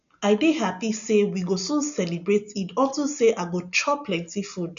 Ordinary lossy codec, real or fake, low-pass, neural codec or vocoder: none; real; 7.2 kHz; none